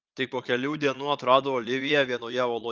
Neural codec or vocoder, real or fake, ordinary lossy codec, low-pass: vocoder, 22.05 kHz, 80 mel bands, Vocos; fake; Opus, 24 kbps; 7.2 kHz